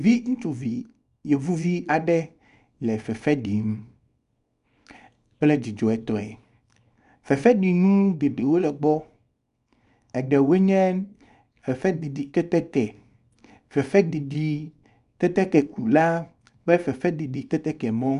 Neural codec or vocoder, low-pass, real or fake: codec, 24 kHz, 0.9 kbps, WavTokenizer, small release; 10.8 kHz; fake